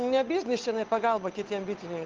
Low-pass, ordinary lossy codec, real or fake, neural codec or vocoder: 7.2 kHz; Opus, 32 kbps; fake; codec, 16 kHz, 8 kbps, FunCodec, trained on Chinese and English, 25 frames a second